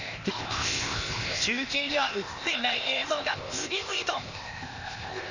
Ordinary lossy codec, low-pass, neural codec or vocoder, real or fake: none; 7.2 kHz; codec, 16 kHz, 0.8 kbps, ZipCodec; fake